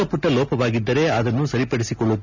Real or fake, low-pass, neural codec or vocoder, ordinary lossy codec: real; 7.2 kHz; none; none